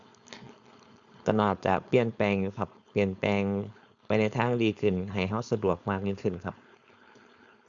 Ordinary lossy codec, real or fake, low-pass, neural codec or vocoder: Opus, 64 kbps; fake; 7.2 kHz; codec, 16 kHz, 4.8 kbps, FACodec